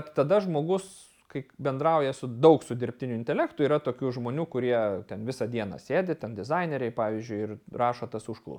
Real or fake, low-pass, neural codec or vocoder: real; 19.8 kHz; none